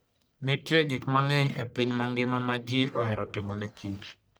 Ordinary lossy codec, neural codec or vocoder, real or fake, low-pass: none; codec, 44.1 kHz, 1.7 kbps, Pupu-Codec; fake; none